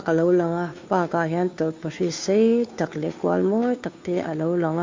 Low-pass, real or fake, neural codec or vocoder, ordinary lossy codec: 7.2 kHz; fake; codec, 16 kHz, 2 kbps, FunCodec, trained on Chinese and English, 25 frames a second; MP3, 48 kbps